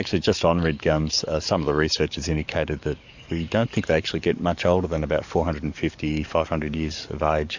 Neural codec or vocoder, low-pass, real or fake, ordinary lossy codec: codec, 44.1 kHz, 7.8 kbps, DAC; 7.2 kHz; fake; Opus, 64 kbps